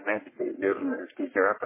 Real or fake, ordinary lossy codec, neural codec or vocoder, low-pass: fake; MP3, 16 kbps; codec, 44.1 kHz, 1.7 kbps, Pupu-Codec; 3.6 kHz